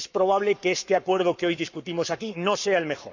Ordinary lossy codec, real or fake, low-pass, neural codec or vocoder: none; fake; 7.2 kHz; codec, 44.1 kHz, 7.8 kbps, Pupu-Codec